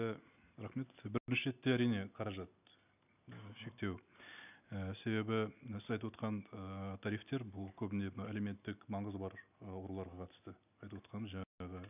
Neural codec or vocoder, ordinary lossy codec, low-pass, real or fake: none; none; 3.6 kHz; real